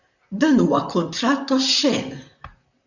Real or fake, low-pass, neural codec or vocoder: fake; 7.2 kHz; vocoder, 44.1 kHz, 128 mel bands, Pupu-Vocoder